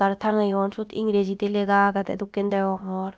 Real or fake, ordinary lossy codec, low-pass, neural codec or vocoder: fake; none; none; codec, 16 kHz, about 1 kbps, DyCAST, with the encoder's durations